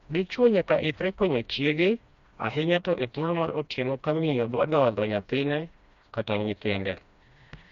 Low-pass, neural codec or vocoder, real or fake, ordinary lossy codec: 7.2 kHz; codec, 16 kHz, 1 kbps, FreqCodec, smaller model; fake; Opus, 64 kbps